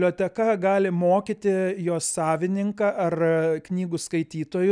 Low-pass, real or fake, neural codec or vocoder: 9.9 kHz; real; none